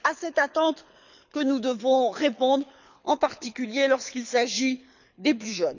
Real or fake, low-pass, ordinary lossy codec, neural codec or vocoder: fake; 7.2 kHz; none; codec, 24 kHz, 6 kbps, HILCodec